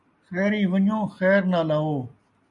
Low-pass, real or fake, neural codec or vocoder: 10.8 kHz; real; none